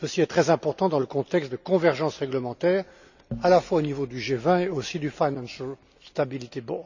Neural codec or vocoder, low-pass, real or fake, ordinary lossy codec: none; 7.2 kHz; real; none